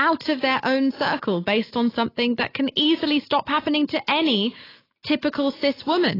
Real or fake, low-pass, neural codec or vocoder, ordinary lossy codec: real; 5.4 kHz; none; AAC, 24 kbps